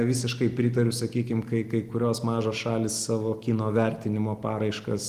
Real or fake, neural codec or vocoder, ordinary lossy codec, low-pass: real; none; Opus, 24 kbps; 14.4 kHz